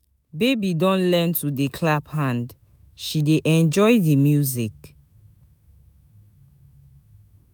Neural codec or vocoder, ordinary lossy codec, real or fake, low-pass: autoencoder, 48 kHz, 128 numbers a frame, DAC-VAE, trained on Japanese speech; none; fake; none